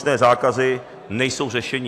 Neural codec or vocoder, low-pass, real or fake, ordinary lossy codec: none; 14.4 kHz; real; AAC, 64 kbps